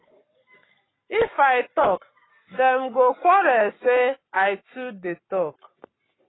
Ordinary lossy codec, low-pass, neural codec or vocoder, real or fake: AAC, 16 kbps; 7.2 kHz; codec, 44.1 kHz, 7.8 kbps, Pupu-Codec; fake